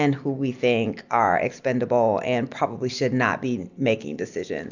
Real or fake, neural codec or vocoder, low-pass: real; none; 7.2 kHz